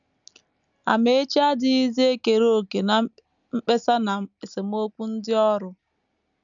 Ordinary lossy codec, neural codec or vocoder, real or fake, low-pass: none; none; real; 7.2 kHz